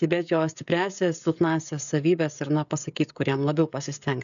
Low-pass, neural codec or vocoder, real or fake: 7.2 kHz; codec, 16 kHz, 16 kbps, FreqCodec, smaller model; fake